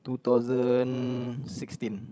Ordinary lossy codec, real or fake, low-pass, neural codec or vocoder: none; fake; none; codec, 16 kHz, 16 kbps, FreqCodec, larger model